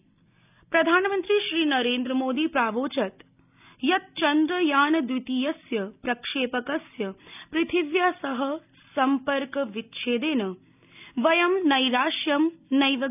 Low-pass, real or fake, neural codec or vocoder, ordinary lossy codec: 3.6 kHz; real; none; none